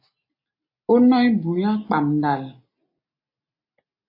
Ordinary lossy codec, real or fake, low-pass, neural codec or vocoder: MP3, 32 kbps; real; 5.4 kHz; none